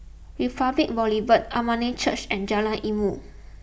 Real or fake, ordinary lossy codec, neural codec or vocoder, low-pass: real; none; none; none